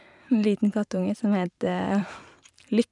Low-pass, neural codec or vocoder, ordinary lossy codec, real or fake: 10.8 kHz; vocoder, 44.1 kHz, 128 mel bands every 512 samples, BigVGAN v2; none; fake